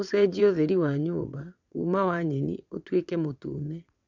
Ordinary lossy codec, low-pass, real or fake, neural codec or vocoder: none; 7.2 kHz; fake; vocoder, 22.05 kHz, 80 mel bands, WaveNeXt